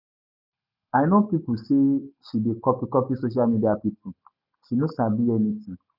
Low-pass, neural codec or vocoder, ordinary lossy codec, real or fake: 5.4 kHz; none; none; real